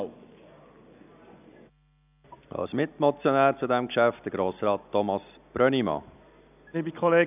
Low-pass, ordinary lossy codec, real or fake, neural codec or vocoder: 3.6 kHz; none; real; none